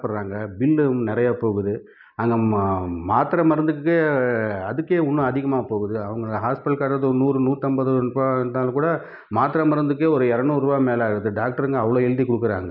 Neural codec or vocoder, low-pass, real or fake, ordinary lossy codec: none; 5.4 kHz; real; none